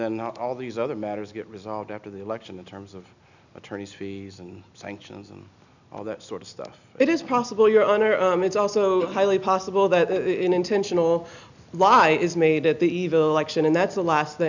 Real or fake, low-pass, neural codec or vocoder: real; 7.2 kHz; none